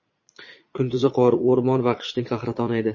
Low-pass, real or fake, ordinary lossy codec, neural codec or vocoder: 7.2 kHz; fake; MP3, 32 kbps; vocoder, 22.05 kHz, 80 mel bands, Vocos